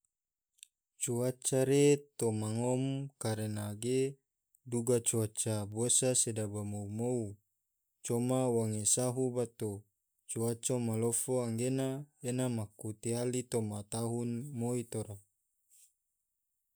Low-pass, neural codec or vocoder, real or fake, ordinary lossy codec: none; none; real; none